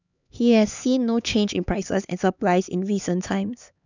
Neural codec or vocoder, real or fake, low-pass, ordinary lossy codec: codec, 16 kHz, 4 kbps, X-Codec, HuBERT features, trained on LibriSpeech; fake; 7.2 kHz; none